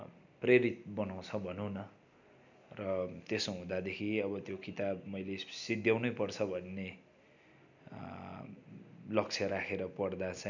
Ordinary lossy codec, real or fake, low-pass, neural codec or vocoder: none; real; 7.2 kHz; none